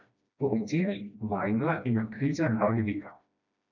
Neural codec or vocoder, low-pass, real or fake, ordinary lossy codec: codec, 16 kHz, 1 kbps, FreqCodec, smaller model; 7.2 kHz; fake; none